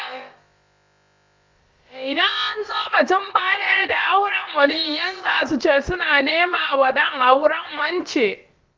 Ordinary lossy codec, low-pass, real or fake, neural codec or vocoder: Opus, 32 kbps; 7.2 kHz; fake; codec, 16 kHz, about 1 kbps, DyCAST, with the encoder's durations